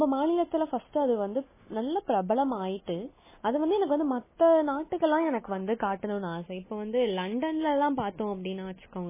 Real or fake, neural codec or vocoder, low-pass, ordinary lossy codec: real; none; 3.6 kHz; MP3, 16 kbps